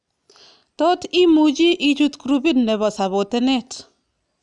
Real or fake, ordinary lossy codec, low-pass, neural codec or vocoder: real; none; 10.8 kHz; none